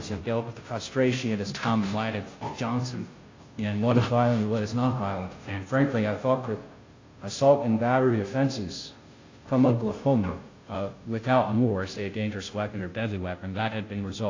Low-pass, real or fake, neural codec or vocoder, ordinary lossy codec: 7.2 kHz; fake; codec, 16 kHz, 0.5 kbps, FunCodec, trained on Chinese and English, 25 frames a second; AAC, 32 kbps